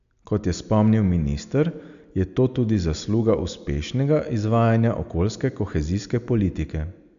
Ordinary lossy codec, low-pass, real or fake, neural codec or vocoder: AAC, 96 kbps; 7.2 kHz; real; none